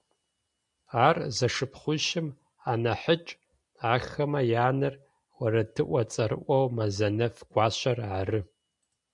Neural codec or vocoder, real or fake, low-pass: none; real; 10.8 kHz